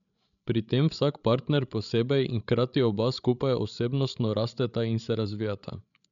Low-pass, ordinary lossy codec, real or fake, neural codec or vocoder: 7.2 kHz; none; fake; codec, 16 kHz, 16 kbps, FreqCodec, larger model